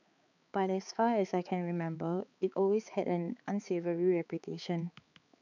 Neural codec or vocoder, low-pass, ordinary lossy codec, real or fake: codec, 16 kHz, 4 kbps, X-Codec, HuBERT features, trained on balanced general audio; 7.2 kHz; none; fake